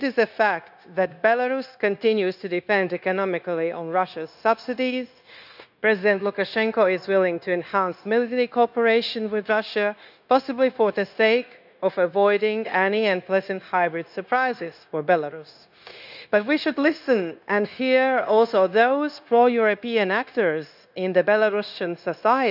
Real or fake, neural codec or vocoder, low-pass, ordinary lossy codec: fake; codec, 16 kHz, 0.9 kbps, LongCat-Audio-Codec; 5.4 kHz; none